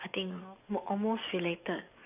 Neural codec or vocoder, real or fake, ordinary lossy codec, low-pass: codec, 44.1 kHz, 7.8 kbps, DAC; fake; AAC, 32 kbps; 3.6 kHz